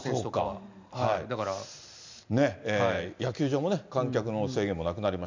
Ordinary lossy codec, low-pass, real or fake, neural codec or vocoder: MP3, 64 kbps; 7.2 kHz; real; none